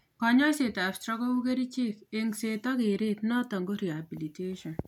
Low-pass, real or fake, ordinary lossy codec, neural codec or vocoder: 19.8 kHz; real; none; none